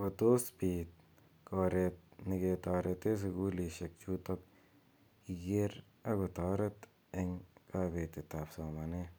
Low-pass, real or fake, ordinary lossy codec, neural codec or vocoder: none; real; none; none